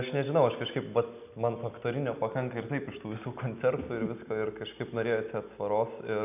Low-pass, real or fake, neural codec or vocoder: 3.6 kHz; real; none